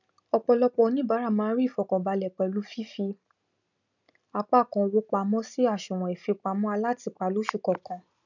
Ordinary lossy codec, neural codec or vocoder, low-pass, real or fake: none; none; 7.2 kHz; real